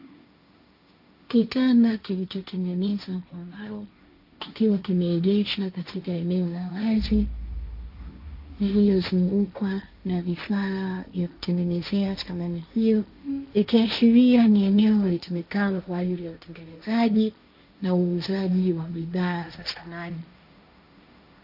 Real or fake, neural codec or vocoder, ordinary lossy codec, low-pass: fake; codec, 16 kHz, 1.1 kbps, Voila-Tokenizer; AAC, 48 kbps; 5.4 kHz